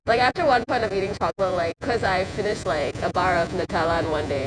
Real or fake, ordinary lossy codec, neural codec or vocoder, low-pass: fake; none; vocoder, 48 kHz, 128 mel bands, Vocos; 9.9 kHz